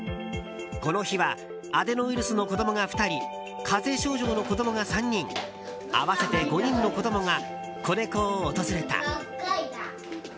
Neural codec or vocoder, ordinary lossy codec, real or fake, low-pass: none; none; real; none